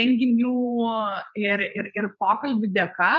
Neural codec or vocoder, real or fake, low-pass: codec, 16 kHz, 4 kbps, FreqCodec, larger model; fake; 7.2 kHz